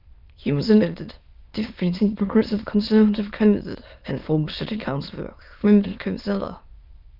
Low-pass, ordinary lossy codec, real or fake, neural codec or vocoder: 5.4 kHz; Opus, 32 kbps; fake; autoencoder, 22.05 kHz, a latent of 192 numbers a frame, VITS, trained on many speakers